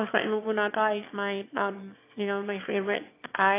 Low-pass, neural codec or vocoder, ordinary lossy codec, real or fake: 3.6 kHz; autoencoder, 22.05 kHz, a latent of 192 numbers a frame, VITS, trained on one speaker; AAC, 24 kbps; fake